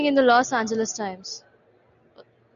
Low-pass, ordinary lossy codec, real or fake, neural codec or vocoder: 7.2 kHz; AAC, 96 kbps; real; none